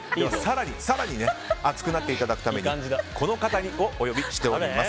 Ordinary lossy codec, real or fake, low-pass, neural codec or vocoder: none; real; none; none